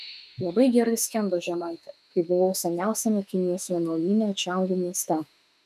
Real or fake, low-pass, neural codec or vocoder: fake; 14.4 kHz; autoencoder, 48 kHz, 32 numbers a frame, DAC-VAE, trained on Japanese speech